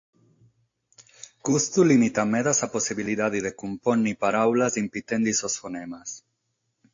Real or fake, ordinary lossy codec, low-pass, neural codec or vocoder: real; AAC, 48 kbps; 7.2 kHz; none